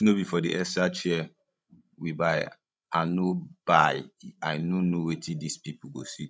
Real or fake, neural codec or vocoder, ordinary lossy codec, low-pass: fake; codec, 16 kHz, 16 kbps, FreqCodec, larger model; none; none